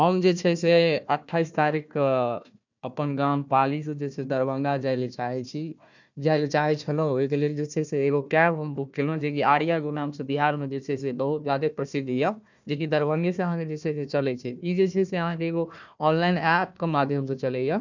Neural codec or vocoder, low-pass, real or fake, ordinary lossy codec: codec, 16 kHz, 1 kbps, FunCodec, trained on Chinese and English, 50 frames a second; 7.2 kHz; fake; none